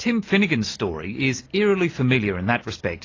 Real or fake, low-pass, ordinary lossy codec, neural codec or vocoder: real; 7.2 kHz; AAC, 32 kbps; none